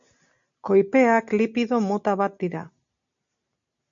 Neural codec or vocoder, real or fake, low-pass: none; real; 7.2 kHz